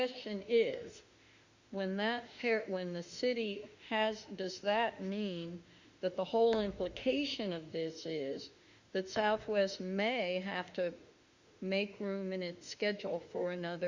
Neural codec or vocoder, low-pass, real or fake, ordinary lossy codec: autoencoder, 48 kHz, 32 numbers a frame, DAC-VAE, trained on Japanese speech; 7.2 kHz; fake; Opus, 64 kbps